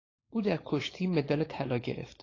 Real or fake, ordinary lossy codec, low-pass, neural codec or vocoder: fake; AAC, 32 kbps; 7.2 kHz; codec, 16 kHz, 4.8 kbps, FACodec